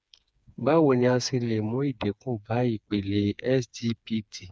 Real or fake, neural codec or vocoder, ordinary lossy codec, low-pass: fake; codec, 16 kHz, 4 kbps, FreqCodec, smaller model; none; none